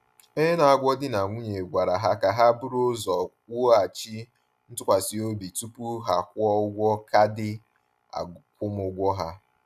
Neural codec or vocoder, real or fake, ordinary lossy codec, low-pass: none; real; none; 14.4 kHz